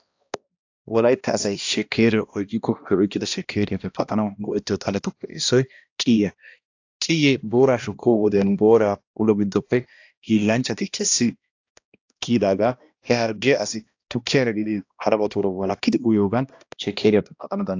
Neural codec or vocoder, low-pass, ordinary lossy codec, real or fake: codec, 16 kHz, 1 kbps, X-Codec, HuBERT features, trained on balanced general audio; 7.2 kHz; AAC, 48 kbps; fake